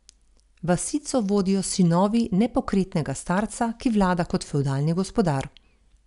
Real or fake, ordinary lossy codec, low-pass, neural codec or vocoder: real; none; 10.8 kHz; none